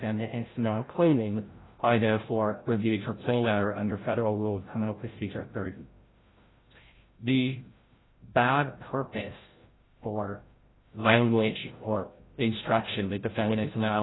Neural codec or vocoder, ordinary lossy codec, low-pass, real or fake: codec, 16 kHz, 0.5 kbps, FreqCodec, larger model; AAC, 16 kbps; 7.2 kHz; fake